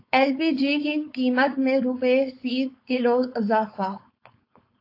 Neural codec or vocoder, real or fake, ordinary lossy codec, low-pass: codec, 16 kHz, 4.8 kbps, FACodec; fake; AAC, 32 kbps; 5.4 kHz